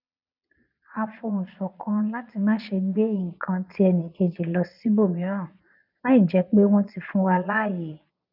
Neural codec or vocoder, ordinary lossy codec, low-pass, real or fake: vocoder, 22.05 kHz, 80 mel bands, Vocos; none; 5.4 kHz; fake